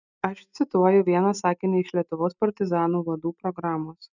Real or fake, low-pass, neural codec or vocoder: real; 7.2 kHz; none